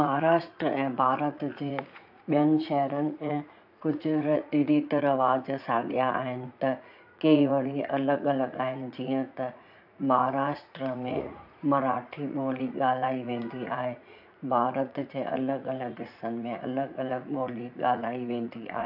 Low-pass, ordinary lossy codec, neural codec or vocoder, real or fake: 5.4 kHz; AAC, 48 kbps; vocoder, 44.1 kHz, 80 mel bands, Vocos; fake